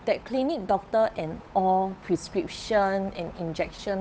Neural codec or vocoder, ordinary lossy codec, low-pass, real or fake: codec, 16 kHz, 8 kbps, FunCodec, trained on Chinese and English, 25 frames a second; none; none; fake